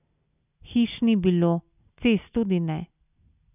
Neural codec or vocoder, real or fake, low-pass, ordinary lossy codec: none; real; 3.6 kHz; none